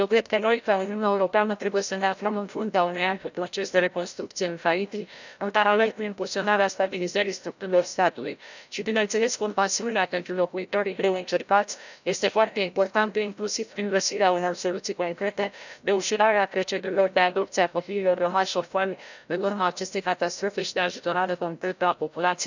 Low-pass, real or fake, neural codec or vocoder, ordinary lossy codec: 7.2 kHz; fake; codec, 16 kHz, 0.5 kbps, FreqCodec, larger model; none